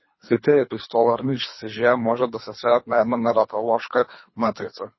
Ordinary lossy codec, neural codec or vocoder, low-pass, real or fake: MP3, 24 kbps; codec, 24 kHz, 3 kbps, HILCodec; 7.2 kHz; fake